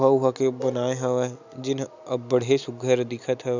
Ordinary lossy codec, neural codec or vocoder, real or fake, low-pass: none; none; real; 7.2 kHz